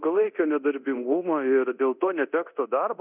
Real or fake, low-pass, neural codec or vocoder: fake; 3.6 kHz; codec, 24 kHz, 0.9 kbps, DualCodec